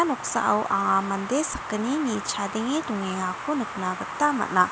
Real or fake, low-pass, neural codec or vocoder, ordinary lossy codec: real; none; none; none